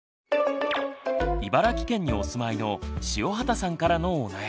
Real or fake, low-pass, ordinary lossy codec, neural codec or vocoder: real; none; none; none